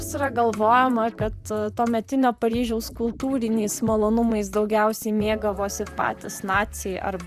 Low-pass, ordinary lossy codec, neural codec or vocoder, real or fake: 14.4 kHz; Opus, 64 kbps; vocoder, 44.1 kHz, 128 mel bands, Pupu-Vocoder; fake